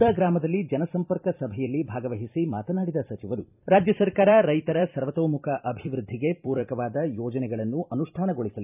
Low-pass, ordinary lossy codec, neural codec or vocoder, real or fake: 3.6 kHz; none; vocoder, 44.1 kHz, 128 mel bands every 256 samples, BigVGAN v2; fake